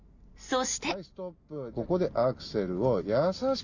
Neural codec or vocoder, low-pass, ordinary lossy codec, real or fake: none; 7.2 kHz; none; real